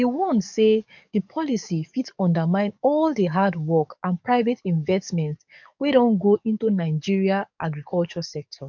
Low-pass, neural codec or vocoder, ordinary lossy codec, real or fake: 7.2 kHz; codec, 44.1 kHz, 7.8 kbps, DAC; none; fake